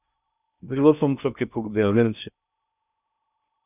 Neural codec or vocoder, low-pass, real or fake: codec, 16 kHz in and 24 kHz out, 0.6 kbps, FocalCodec, streaming, 2048 codes; 3.6 kHz; fake